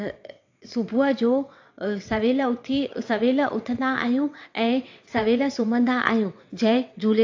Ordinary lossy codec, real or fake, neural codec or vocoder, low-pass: AAC, 48 kbps; fake; vocoder, 22.05 kHz, 80 mel bands, WaveNeXt; 7.2 kHz